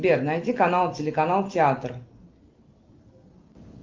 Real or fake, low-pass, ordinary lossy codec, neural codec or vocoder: real; 7.2 kHz; Opus, 24 kbps; none